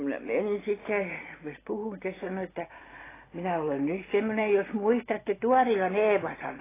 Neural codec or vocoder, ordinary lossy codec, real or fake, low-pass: none; AAC, 16 kbps; real; 3.6 kHz